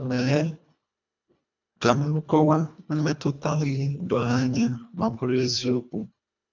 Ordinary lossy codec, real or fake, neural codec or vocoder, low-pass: none; fake; codec, 24 kHz, 1.5 kbps, HILCodec; 7.2 kHz